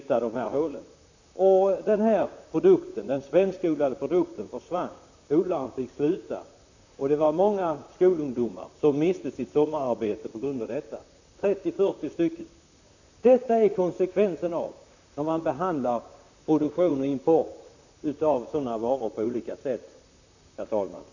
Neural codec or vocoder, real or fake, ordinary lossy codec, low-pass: vocoder, 44.1 kHz, 128 mel bands, Pupu-Vocoder; fake; none; 7.2 kHz